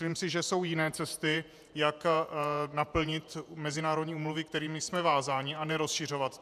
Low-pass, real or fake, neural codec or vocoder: 14.4 kHz; fake; vocoder, 48 kHz, 128 mel bands, Vocos